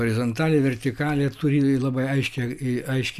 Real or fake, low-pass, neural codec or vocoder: real; 14.4 kHz; none